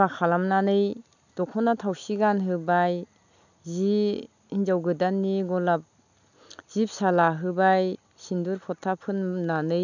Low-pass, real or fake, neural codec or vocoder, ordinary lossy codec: 7.2 kHz; real; none; none